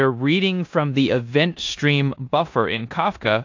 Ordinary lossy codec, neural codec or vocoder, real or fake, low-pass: AAC, 48 kbps; codec, 16 kHz in and 24 kHz out, 0.9 kbps, LongCat-Audio-Codec, four codebook decoder; fake; 7.2 kHz